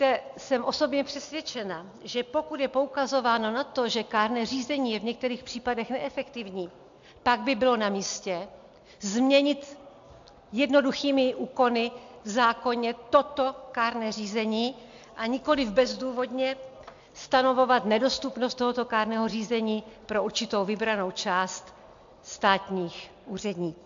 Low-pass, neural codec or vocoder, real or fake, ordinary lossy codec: 7.2 kHz; none; real; AAC, 64 kbps